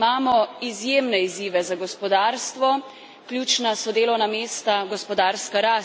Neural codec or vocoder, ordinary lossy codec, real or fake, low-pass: none; none; real; none